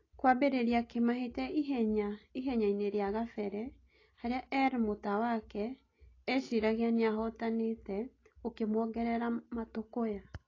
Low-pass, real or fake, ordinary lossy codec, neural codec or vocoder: 7.2 kHz; real; AAC, 32 kbps; none